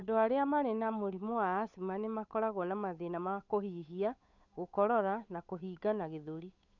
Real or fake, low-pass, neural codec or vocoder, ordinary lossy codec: fake; 7.2 kHz; codec, 16 kHz, 8 kbps, FunCodec, trained on Chinese and English, 25 frames a second; none